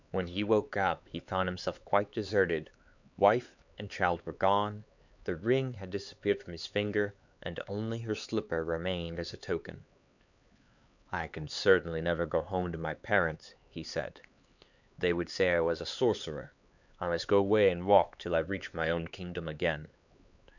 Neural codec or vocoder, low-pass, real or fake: codec, 16 kHz, 4 kbps, X-Codec, HuBERT features, trained on LibriSpeech; 7.2 kHz; fake